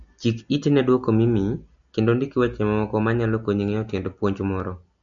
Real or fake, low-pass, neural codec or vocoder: real; 7.2 kHz; none